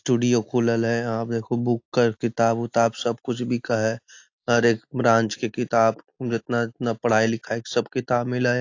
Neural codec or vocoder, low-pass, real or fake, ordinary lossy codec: none; 7.2 kHz; real; AAC, 48 kbps